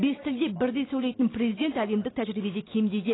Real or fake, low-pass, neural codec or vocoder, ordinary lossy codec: real; 7.2 kHz; none; AAC, 16 kbps